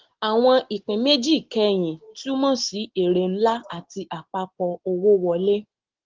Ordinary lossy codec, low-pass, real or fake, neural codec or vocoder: Opus, 16 kbps; 7.2 kHz; real; none